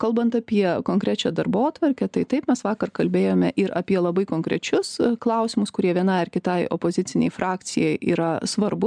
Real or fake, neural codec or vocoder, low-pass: real; none; 9.9 kHz